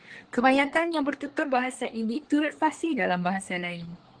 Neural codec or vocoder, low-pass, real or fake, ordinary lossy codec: codec, 24 kHz, 1 kbps, SNAC; 9.9 kHz; fake; Opus, 16 kbps